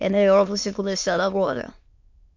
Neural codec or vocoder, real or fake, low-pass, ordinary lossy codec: autoencoder, 22.05 kHz, a latent of 192 numbers a frame, VITS, trained on many speakers; fake; 7.2 kHz; MP3, 48 kbps